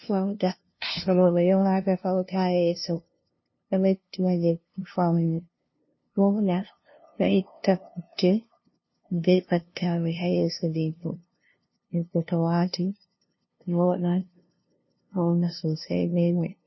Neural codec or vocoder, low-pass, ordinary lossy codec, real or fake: codec, 16 kHz, 0.5 kbps, FunCodec, trained on LibriTTS, 25 frames a second; 7.2 kHz; MP3, 24 kbps; fake